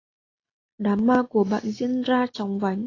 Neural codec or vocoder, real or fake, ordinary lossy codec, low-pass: none; real; AAC, 32 kbps; 7.2 kHz